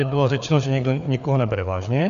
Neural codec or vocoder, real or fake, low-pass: codec, 16 kHz, 4 kbps, FreqCodec, larger model; fake; 7.2 kHz